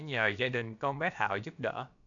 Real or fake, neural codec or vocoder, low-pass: fake; codec, 16 kHz, about 1 kbps, DyCAST, with the encoder's durations; 7.2 kHz